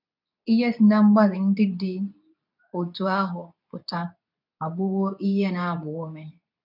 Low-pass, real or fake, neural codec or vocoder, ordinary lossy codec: 5.4 kHz; fake; codec, 24 kHz, 0.9 kbps, WavTokenizer, medium speech release version 2; none